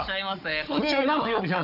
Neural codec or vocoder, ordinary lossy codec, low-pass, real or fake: codec, 16 kHz, 16 kbps, FunCodec, trained on Chinese and English, 50 frames a second; AAC, 48 kbps; 5.4 kHz; fake